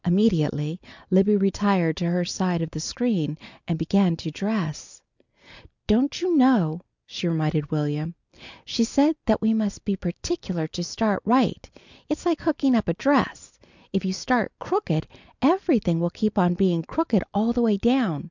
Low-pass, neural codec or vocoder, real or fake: 7.2 kHz; none; real